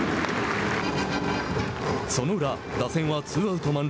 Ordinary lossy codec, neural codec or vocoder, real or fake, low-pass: none; none; real; none